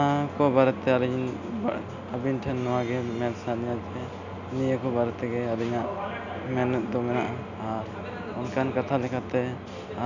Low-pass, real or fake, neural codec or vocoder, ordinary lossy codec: 7.2 kHz; real; none; none